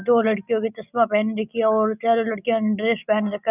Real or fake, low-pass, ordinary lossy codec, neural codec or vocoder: real; 3.6 kHz; none; none